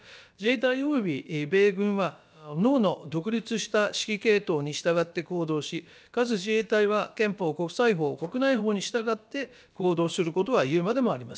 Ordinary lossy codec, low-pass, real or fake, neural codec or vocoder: none; none; fake; codec, 16 kHz, about 1 kbps, DyCAST, with the encoder's durations